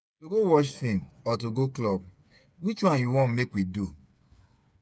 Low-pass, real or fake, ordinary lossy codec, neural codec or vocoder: none; fake; none; codec, 16 kHz, 8 kbps, FreqCodec, smaller model